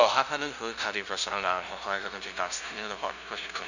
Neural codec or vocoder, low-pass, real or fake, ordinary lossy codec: codec, 16 kHz, 0.5 kbps, FunCodec, trained on LibriTTS, 25 frames a second; 7.2 kHz; fake; none